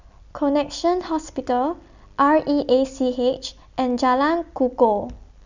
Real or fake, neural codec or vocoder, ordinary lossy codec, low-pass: real; none; Opus, 64 kbps; 7.2 kHz